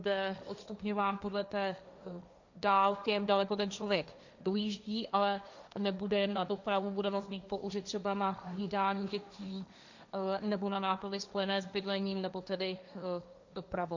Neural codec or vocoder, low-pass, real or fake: codec, 16 kHz, 1.1 kbps, Voila-Tokenizer; 7.2 kHz; fake